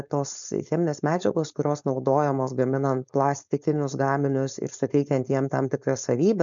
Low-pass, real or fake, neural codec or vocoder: 7.2 kHz; fake; codec, 16 kHz, 4.8 kbps, FACodec